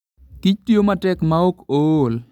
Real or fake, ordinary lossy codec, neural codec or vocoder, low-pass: real; none; none; 19.8 kHz